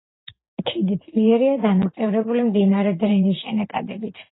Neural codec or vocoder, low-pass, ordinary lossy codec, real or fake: vocoder, 44.1 kHz, 128 mel bands every 512 samples, BigVGAN v2; 7.2 kHz; AAC, 16 kbps; fake